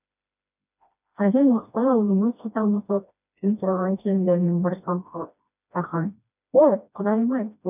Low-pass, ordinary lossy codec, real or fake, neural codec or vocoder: 3.6 kHz; none; fake; codec, 16 kHz, 1 kbps, FreqCodec, smaller model